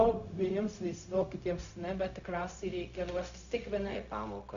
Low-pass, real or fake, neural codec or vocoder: 7.2 kHz; fake; codec, 16 kHz, 0.4 kbps, LongCat-Audio-Codec